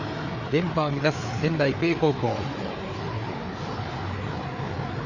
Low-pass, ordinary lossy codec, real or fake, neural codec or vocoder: 7.2 kHz; none; fake; codec, 16 kHz, 4 kbps, FreqCodec, larger model